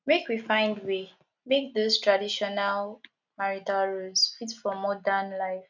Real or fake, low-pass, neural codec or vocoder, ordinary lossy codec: real; 7.2 kHz; none; none